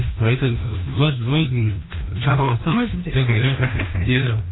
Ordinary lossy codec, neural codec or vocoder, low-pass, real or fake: AAC, 16 kbps; codec, 16 kHz, 1 kbps, FreqCodec, larger model; 7.2 kHz; fake